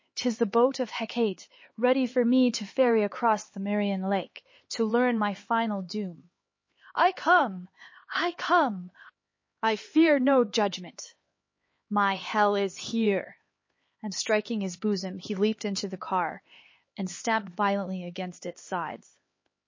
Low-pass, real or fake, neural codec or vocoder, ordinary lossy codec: 7.2 kHz; fake; codec, 16 kHz, 4 kbps, X-Codec, HuBERT features, trained on LibriSpeech; MP3, 32 kbps